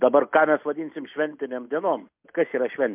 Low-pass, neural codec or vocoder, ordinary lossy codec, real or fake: 3.6 kHz; none; MP3, 32 kbps; real